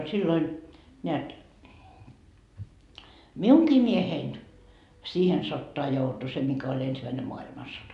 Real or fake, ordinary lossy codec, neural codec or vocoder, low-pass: real; none; none; 10.8 kHz